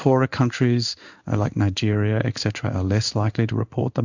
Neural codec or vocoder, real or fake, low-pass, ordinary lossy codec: codec, 16 kHz in and 24 kHz out, 1 kbps, XY-Tokenizer; fake; 7.2 kHz; Opus, 64 kbps